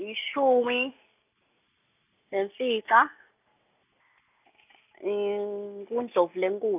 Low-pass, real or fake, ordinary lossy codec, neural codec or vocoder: 3.6 kHz; real; none; none